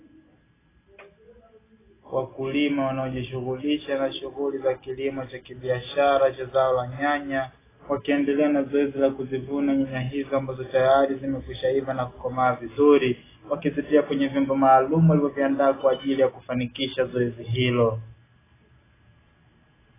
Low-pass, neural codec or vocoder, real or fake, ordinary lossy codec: 3.6 kHz; none; real; AAC, 16 kbps